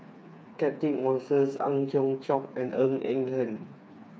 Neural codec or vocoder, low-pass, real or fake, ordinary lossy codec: codec, 16 kHz, 4 kbps, FreqCodec, smaller model; none; fake; none